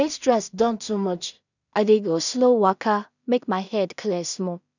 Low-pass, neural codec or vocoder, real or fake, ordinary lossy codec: 7.2 kHz; codec, 16 kHz in and 24 kHz out, 0.4 kbps, LongCat-Audio-Codec, two codebook decoder; fake; none